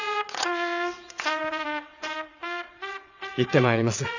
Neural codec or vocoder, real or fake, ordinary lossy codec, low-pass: vocoder, 22.05 kHz, 80 mel bands, WaveNeXt; fake; none; 7.2 kHz